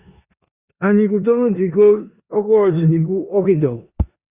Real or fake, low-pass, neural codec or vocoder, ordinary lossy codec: fake; 3.6 kHz; codec, 16 kHz in and 24 kHz out, 0.9 kbps, LongCat-Audio-Codec, four codebook decoder; Opus, 64 kbps